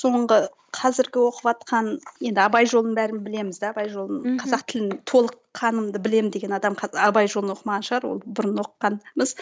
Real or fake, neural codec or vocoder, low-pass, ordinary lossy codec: real; none; none; none